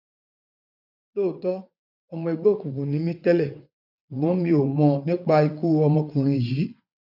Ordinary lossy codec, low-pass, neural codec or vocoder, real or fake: none; 5.4 kHz; vocoder, 22.05 kHz, 80 mel bands, WaveNeXt; fake